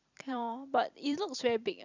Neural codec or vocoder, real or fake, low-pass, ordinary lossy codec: vocoder, 44.1 kHz, 128 mel bands every 256 samples, BigVGAN v2; fake; 7.2 kHz; none